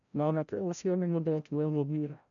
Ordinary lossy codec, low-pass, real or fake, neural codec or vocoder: none; 7.2 kHz; fake; codec, 16 kHz, 0.5 kbps, FreqCodec, larger model